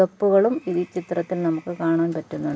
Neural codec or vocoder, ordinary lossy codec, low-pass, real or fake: none; none; none; real